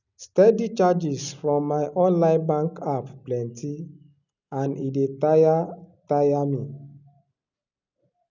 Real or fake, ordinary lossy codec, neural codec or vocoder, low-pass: real; none; none; 7.2 kHz